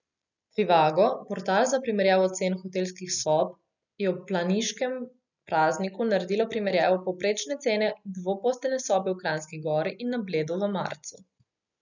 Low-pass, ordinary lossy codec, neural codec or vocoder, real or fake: 7.2 kHz; none; none; real